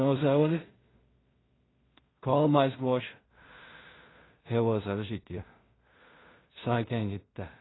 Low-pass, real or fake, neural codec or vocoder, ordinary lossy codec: 7.2 kHz; fake; codec, 16 kHz in and 24 kHz out, 0.4 kbps, LongCat-Audio-Codec, two codebook decoder; AAC, 16 kbps